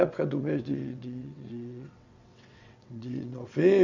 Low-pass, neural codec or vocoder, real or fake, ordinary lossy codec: 7.2 kHz; vocoder, 22.05 kHz, 80 mel bands, WaveNeXt; fake; none